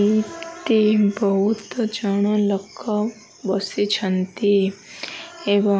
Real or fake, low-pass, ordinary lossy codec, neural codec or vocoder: real; none; none; none